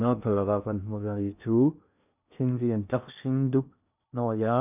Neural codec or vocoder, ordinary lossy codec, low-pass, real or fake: codec, 16 kHz in and 24 kHz out, 0.6 kbps, FocalCodec, streaming, 2048 codes; none; 3.6 kHz; fake